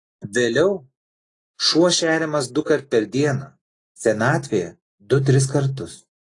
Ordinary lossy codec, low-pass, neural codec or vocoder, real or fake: AAC, 32 kbps; 10.8 kHz; none; real